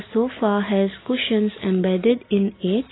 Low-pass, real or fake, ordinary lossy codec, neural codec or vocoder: 7.2 kHz; real; AAC, 16 kbps; none